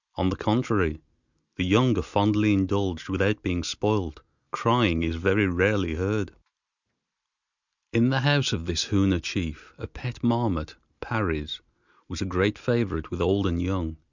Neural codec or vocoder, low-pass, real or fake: none; 7.2 kHz; real